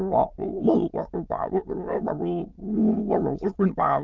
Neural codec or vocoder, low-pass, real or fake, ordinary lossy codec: autoencoder, 22.05 kHz, a latent of 192 numbers a frame, VITS, trained on many speakers; 7.2 kHz; fake; Opus, 32 kbps